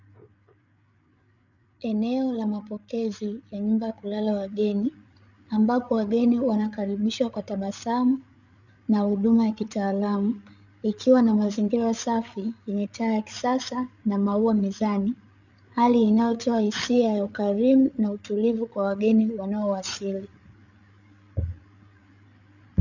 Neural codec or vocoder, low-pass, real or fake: codec, 16 kHz, 8 kbps, FreqCodec, larger model; 7.2 kHz; fake